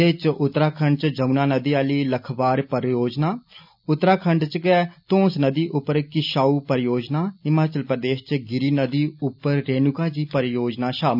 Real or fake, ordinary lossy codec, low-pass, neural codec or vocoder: real; none; 5.4 kHz; none